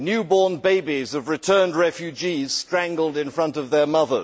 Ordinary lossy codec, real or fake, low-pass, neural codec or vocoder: none; real; none; none